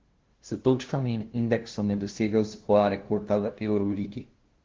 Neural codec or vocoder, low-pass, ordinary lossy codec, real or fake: codec, 16 kHz, 0.5 kbps, FunCodec, trained on LibriTTS, 25 frames a second; 7.2 kHz; Opus, 16 kbps; fake